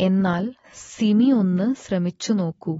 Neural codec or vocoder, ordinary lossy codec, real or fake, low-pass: none; AAC, 24 kbps; real; 19.8 kHz